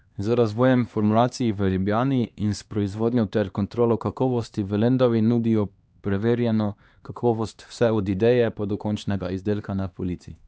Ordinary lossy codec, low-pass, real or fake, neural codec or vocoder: none; none; fake; codec, 16 kHz, 2 kbps, X-Codec, HuBERT features, trained on LibriSpeech